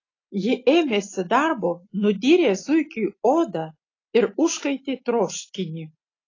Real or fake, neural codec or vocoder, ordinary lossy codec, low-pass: real; none; AAC, 32 kbps; 7.2 kHz